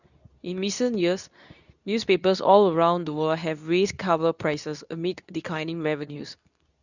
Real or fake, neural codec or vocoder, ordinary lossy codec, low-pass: fake; codec, 24 kHz, 0.9 kbps, WavTokenizer, medium speech release version 2; none; 7.2 kHz